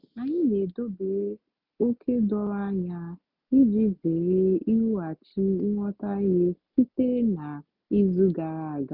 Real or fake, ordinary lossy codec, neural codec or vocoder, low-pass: real; none; none; 5.4 kHz